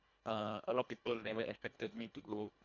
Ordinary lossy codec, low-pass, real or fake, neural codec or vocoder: none; 7.2 kHz; fake; codec, 24 kHz, 1.5 kbps, HILCodec